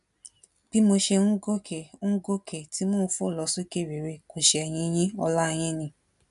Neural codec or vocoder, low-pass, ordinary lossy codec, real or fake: none; 10.8 kHz; none; real